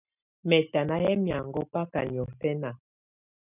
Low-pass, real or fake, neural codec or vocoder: 3.6 kHz; real; none